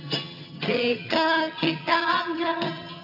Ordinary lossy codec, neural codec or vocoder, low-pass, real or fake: none; vocoder, 22.05 kHz, 80 mel bands, HiFi-GAN; 5.4 kHz; fake